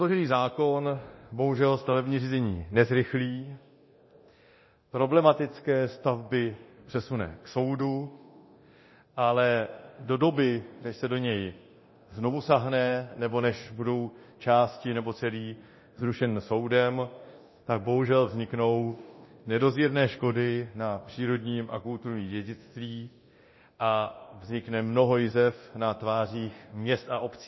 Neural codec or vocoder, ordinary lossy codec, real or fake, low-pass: codec, 24 kHz, 0.9 kbps, DualCodec; MP3, 24 kbps; fake; 7.2 kHz